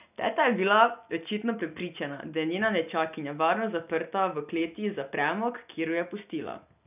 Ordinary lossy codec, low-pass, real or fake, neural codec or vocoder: none; 3.6 kHz; real; none